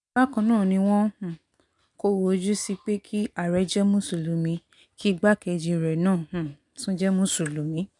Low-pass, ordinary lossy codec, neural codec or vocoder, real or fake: 10.8 kHz; none; none; real